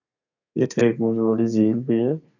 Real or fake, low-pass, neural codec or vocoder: fake; 7.2 kHz; codec, 32 kHz, 1.9 kbps, SNAC